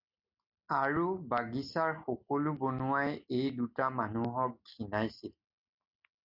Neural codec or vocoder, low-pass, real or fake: none; 5.4 kHz; real